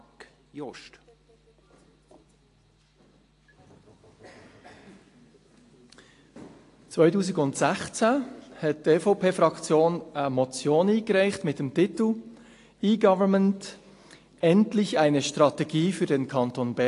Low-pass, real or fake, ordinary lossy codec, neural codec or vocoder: 10.8 kHz; real; AAC, 48 kbps; none